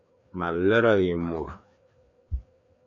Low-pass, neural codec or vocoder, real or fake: 7.2 kHz; codec, 16 kHz, 2 kbps, FreqCodec, larger model; fake